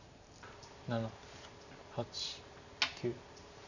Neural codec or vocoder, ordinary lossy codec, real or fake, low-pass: vocoder, 44.1 kHz, 128 mel bands every 512 samples, BigVGAN v2; none; fake; 7.2 kHz